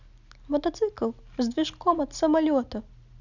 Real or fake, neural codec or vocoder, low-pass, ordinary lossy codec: real; none; 7.2 kHz; none